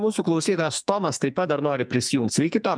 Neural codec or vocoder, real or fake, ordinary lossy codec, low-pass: codec, 44.1 kHz, 2.6 kbps, SNAC; fake; MP3, 96 kbps; 9.9 kHz